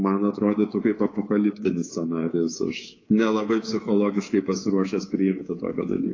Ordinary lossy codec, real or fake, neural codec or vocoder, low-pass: AAC, 32 kbps; fake; codec, 24 kHz, 3.1 kbps, DualCodec; 7.2 kHz